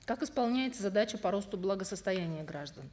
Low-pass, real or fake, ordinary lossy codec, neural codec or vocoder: none; real; none; none